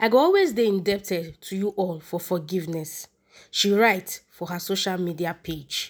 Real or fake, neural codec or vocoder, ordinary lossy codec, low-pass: real; none; none; none